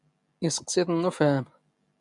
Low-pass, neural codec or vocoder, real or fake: 10.8 kHz; none; real